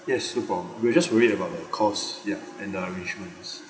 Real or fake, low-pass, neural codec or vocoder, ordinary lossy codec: real; none; none; none